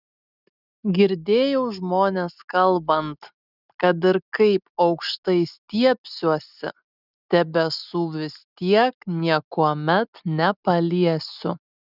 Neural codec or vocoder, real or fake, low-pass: none; real; 5.4 kHz